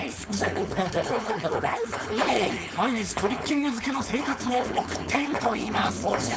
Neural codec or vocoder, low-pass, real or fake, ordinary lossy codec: codec, 16 kHz, 4.8 kbps, FACodec; none; fake; none